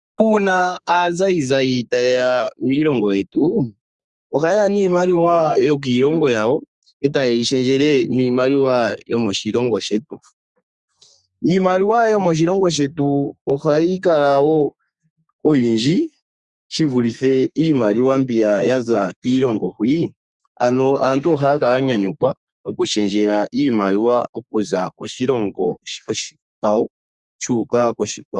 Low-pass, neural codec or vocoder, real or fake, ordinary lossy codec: 10.8 kHz; codec, 32 kHz, 1.9 kbps, SNAC; fake; Opus, 64 kbps